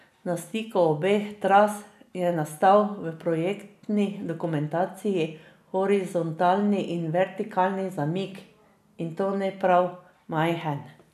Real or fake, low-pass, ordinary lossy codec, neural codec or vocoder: real; 14.4 kHz; none; none